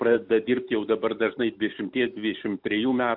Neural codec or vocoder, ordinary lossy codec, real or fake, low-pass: none; AAC, 48 kbps; real; 5.4 kHz